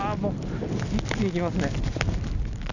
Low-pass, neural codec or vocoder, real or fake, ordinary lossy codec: 7.2 kHz; none; real; none